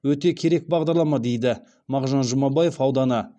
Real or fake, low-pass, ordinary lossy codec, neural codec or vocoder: real; 9.9 kHz; none; none